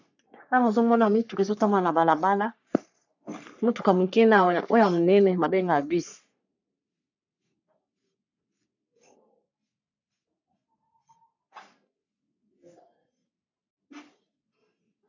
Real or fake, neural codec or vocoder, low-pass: fake; codec, 44.1 kHz, 3.4 kbps, Pupu-Codec; 7.2 kHz